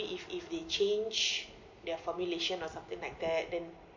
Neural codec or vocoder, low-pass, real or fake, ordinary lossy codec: none; 7.2 kHz; real; MP3, 48 kbps